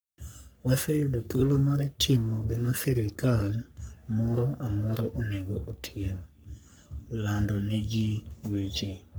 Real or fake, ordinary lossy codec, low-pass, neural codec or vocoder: fake; none; none; codec, 44.1 kHz, 3.4 kbps, Pupu-Codec